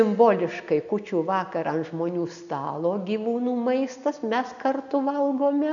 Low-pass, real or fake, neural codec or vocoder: 7.2 kHz; real; none